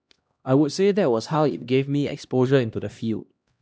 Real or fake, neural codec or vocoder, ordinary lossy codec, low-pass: fake; codec, 16 kHz, 1 kbps, X-Codec, HuBERT features, trained on LibriSpeech; none; none